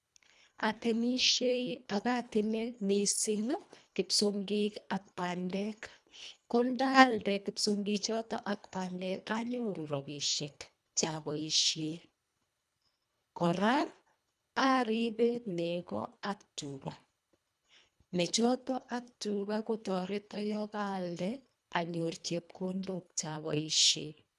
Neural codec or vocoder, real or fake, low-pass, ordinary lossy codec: codec, 24 kHz, 1.5 kbps, HILCodec; fake; none; none